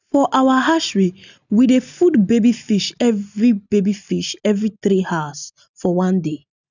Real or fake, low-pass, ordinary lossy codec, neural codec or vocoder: real; 7.2 kHz; none; none